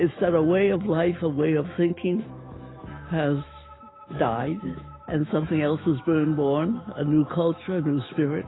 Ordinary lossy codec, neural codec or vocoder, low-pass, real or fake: AAC, 16 kbps; autoencoder, 48 kHz, 128 numbers a frame, DAC-VAE, trained on Japanese speech; 7.2 kHz; fake